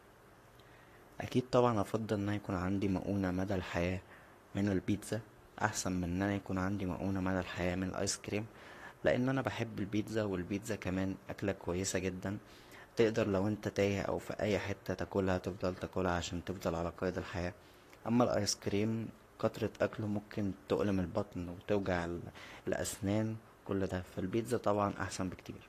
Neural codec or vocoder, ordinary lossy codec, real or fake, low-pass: codec, 44.1 kHz, 7.8 kbps, Pupu-Codec; AAC, 48 kbps; fake; 14.4 kHz